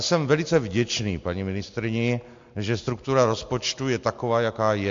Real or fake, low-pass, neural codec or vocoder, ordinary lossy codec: real; 7.2 kHz; none; MP3, 48 kbps